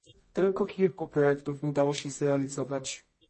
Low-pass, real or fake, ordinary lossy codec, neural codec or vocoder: 10.8 kHz; fake; MP3, 32 kbps; codec, 24 kHz, 0.9 kbps, WavTokenizer, medium music audio release